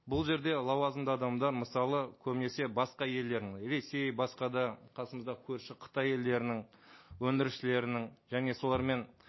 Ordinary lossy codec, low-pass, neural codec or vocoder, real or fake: MP3, 24 kbps; 7.2 kHz; none; real